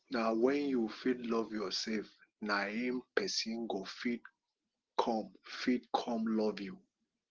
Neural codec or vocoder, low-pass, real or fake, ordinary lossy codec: none; 7.2 kHz; real; Opus, 16 kbps